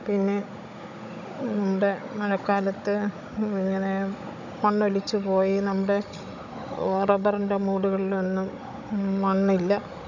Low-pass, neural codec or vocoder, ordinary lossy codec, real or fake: 7.2 kHz; codec, 16 kHz, 16 kbps, FunCodec, trained on Chinese and English, 50 frames a second; none; fake